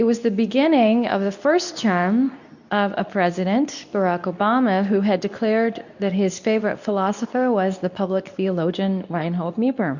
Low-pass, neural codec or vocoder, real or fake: 7.2 kHz; codec, 24 kHz, 0.9 kbps, WavTokenizer, medium speech release version 2; fake